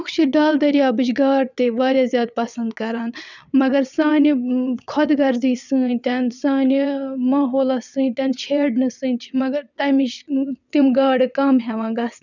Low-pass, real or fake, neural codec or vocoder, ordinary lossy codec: 7.2 kHz; fake; vocoder, 22.05 kHz, 80 mel bands, WaveNeXt; none